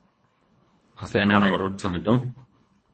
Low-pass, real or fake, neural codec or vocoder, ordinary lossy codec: 9.9 kHz; fake; codec, 24 kHz, 1.5 kbps, HILCodec; MP3, 32 kbps